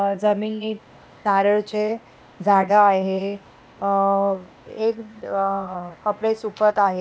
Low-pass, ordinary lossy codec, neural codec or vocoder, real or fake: none; none; codec, 16 kHz, 0.8 kbps, ZipCodec; fake